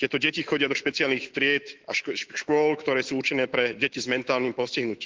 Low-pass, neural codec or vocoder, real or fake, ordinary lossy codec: 7.2 kHz; autoencoder, 48 kHz, 128 numbers a frame, DAC-VAE, trained on Japanese speech; fake; Opus, 16 kbps